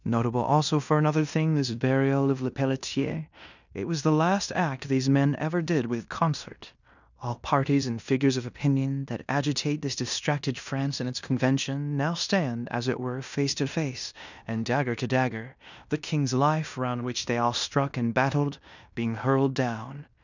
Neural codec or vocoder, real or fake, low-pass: codec, 16 kHz in and 24 kHz out, 0.9 kbps, LongCat-Audio-Codec, fine tuned four codebook decoder; fake; 7.2 kHz